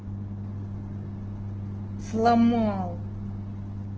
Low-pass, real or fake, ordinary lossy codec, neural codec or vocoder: 7.2 kHz; real; Opus, 16 kbps; none